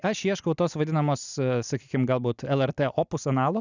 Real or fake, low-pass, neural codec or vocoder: real; 7.2 kHz; none